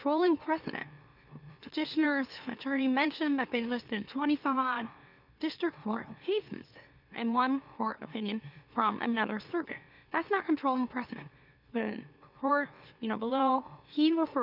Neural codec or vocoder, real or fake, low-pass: autoencoder, 44.1 kHz, a latent of 192 numbers a frame, MeloTTS; fake; 5.4 kHz